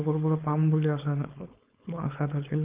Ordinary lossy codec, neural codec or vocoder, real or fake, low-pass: Opus, 32 kbps; codec, 16 kHz, 4.8 kbps, FACodec; fake; 3.6 kHz